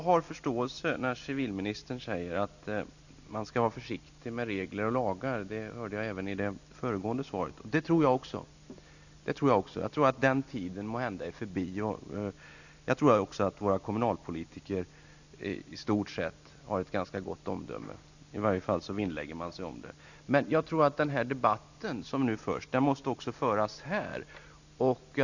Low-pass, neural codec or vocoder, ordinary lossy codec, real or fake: 7.2 kHz; none; none; real